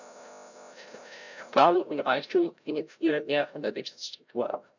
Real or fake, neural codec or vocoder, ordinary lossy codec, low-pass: fake; codec, 16 kHz, 0.5 kbps, FreqCodec, larger model; none; 7.2 kHz